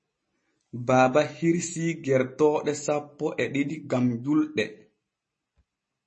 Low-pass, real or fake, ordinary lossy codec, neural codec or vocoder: 10.8 kHz; real; MP3, 32 kbps; none